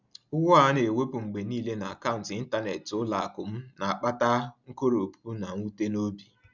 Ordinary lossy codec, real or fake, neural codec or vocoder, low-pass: none; real; none; 7.2 kHz